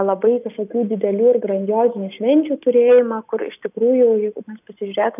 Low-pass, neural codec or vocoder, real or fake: 3.6 kHz; none; real